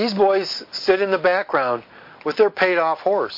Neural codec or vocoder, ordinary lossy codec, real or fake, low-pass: none; MP3, 32 kbps; real; 5.4 kHz